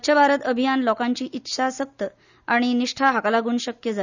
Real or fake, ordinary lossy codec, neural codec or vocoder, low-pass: real; none; none; 7.2 kHz